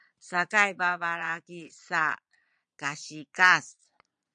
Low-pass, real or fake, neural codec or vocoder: 9.9 kHz; fake; vocoder, 22.05 kHz, 80 mel bands, Vocos